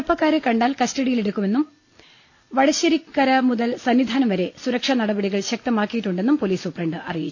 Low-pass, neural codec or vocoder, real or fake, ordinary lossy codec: 7.2 kHz; none; real; AAC, 48 kbps